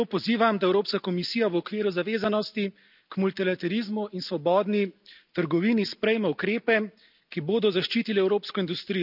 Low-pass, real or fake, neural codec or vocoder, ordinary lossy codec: 5.4 kHz; real; none; none